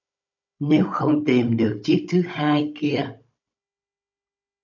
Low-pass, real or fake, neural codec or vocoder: 7.2 kHz; fake; codec, 16 kHz, 16 kbps, FunCodec, trained on Chinese and English, 50 frames a second